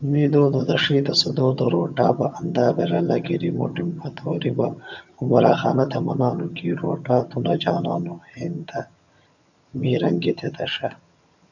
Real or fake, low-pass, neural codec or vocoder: fake; 7.2 kHz; vocoder, 22.05 kHz, 80 mel bands, HiFi-GAN